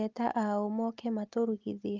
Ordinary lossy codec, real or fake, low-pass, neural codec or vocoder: Opus, 32 kbps; real; 7.2 kHz; none